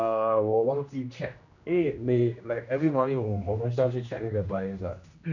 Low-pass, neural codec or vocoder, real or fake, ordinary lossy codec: 7.2 kHz; codec, 16 kHz, 1 kbps, X-Codec, HuBERT features, trained on general audio; fake; none